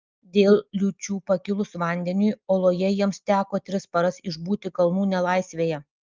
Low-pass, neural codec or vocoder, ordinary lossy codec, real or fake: 7.2 kHz; none; Opus, 24 kbps; real